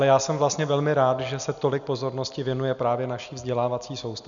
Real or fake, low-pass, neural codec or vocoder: real; 7.2 kHz; none